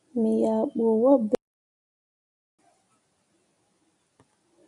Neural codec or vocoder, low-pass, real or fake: none; 10.8 kHz; real